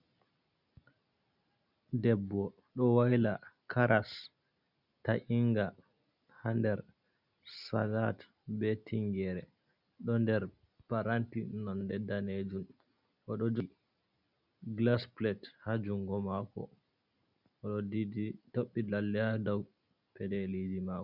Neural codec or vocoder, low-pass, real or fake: none; 5.4 kHz; real